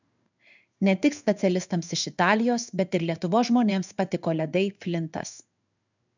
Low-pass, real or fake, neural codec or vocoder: 7.2 kHz; fake; codec, 16 kHz in and 24 kHz out, 1 kbps, XY-Tokenizer